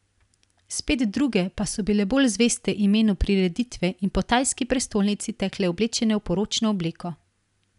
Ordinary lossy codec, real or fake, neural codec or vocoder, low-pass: none; real; none; 10.8 kHz